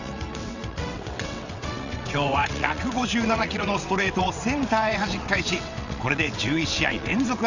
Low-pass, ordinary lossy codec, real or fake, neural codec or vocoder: 7.2 kHz; none; fake; vocoder, 22.05 kHz, 80 mel bands, WaveNeXt